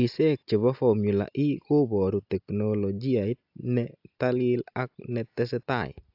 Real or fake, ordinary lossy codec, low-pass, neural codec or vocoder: real; AAC, 48 kbps; 5.4 kHz; none